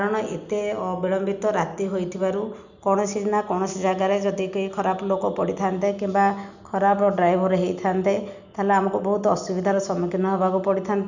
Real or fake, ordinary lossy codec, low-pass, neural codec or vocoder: real; none; 7.2 kHz; none